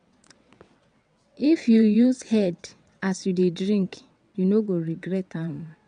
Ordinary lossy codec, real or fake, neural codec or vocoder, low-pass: none; fake; vocoder, 22.05 kHz, 80 mel bands, WaveNeXt; 9.9 kHz